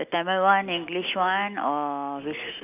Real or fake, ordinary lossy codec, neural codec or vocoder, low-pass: real; none; none; 3.6 kHz